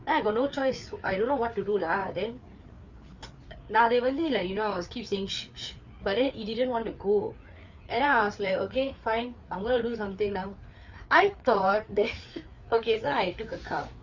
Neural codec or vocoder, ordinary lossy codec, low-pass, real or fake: codec, 16 kHz, 4 kbps, FreqCodec, larger model; Opus, 64 kbps; 7.2 kHz; fake